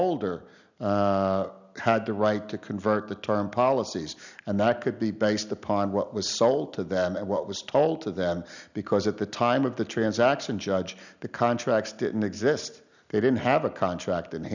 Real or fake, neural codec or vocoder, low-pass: real; none; 7.2 kHz